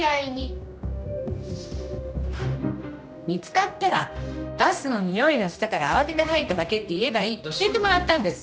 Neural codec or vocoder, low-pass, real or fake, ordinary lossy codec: codec, 16 kHz, 1 kbps, X-Codec, HuBERT features, trained on general audio; none; fake; none